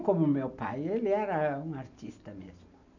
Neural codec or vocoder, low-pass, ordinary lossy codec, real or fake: none; 7.2 kHz; none; real